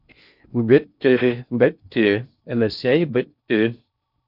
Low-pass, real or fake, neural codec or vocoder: 5.4 kHz; fake; codec, 16 kHz in and 24 kHz out, 0.6 kbps, FocalCodec, streaming, 4096 codes